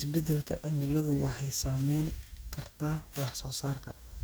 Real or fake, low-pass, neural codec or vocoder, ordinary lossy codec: fake; none; codec, 44.1 kHz, 2.6 kbps, DAC; none